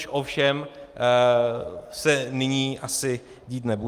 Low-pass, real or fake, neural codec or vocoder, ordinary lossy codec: 14.4 kHz; real; none; Opus, 32 kbps